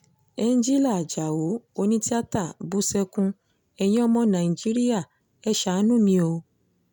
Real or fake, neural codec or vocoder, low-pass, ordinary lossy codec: real; none; 19.8 kHz; none